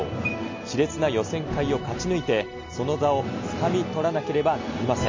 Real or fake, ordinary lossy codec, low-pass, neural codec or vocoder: real; MP3, 64 kbps; 7.2 kHz; none